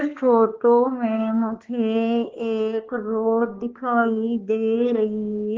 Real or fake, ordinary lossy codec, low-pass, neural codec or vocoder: fake; Opus, 16 kbps; 7.2 kHz; codec, 16 kHz, 2 kbps, X-Codec, HuBERT features, trained on balanced general audio